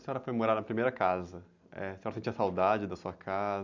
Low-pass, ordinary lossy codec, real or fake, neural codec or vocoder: 7.2 kHz; none; real; none